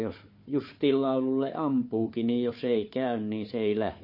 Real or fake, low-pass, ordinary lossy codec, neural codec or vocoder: fake; 5.4 kHz; MP3, 32 kbps; codec, 16 kHz, 4 kbps, FunCodec, trained on Chinese and English, 50 frames a second